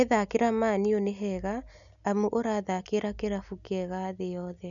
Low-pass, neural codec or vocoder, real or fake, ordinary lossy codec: 7.2 kHz; none; real; none